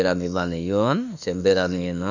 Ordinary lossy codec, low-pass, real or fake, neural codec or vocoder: none; 7.2 kHz; fake; autoencoder, 48 kHz, 32 numbers a frame, DAC-VAE, trained on Japanese speech